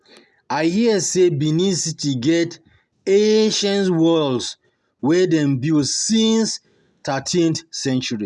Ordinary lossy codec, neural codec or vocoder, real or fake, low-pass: none; none; real; none